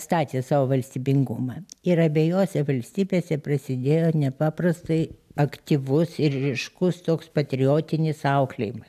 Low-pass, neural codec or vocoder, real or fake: 14.4 kHz; none; real